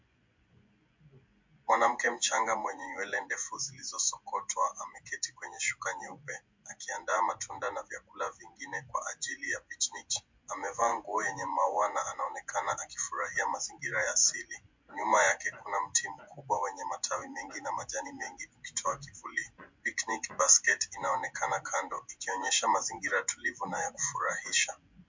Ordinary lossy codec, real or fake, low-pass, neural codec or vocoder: MP3, 64 kbps; fake; 7.2 kHz; vocoder, 24 kHz, 100 mel bands, Vocos